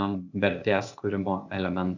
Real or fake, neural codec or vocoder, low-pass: fake; codec, 16 kHz, 0.8 kbps, ZipCodec; 7.2 kHz